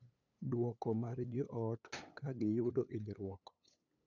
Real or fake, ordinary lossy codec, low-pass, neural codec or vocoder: fake; none; 7.2 kHz; codec, 16 kHz, 8 kbps, FunCodec, trained on LibriTTS, 25 frames a second